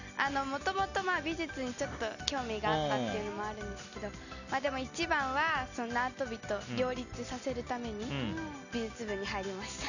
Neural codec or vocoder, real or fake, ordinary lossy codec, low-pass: none; real; none; 7.2 kHz